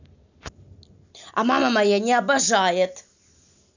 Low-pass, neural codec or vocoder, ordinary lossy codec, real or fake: 7.2 kHz; none; none; real